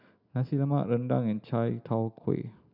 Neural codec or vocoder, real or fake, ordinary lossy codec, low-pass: none; real; none; 5.4 kHz